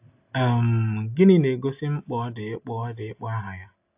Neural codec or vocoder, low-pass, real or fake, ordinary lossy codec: none; 3.6 kHz; real; AAC, 32 kbps